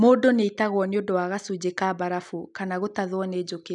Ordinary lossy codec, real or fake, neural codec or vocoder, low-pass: none; real; none; 10.8 kHz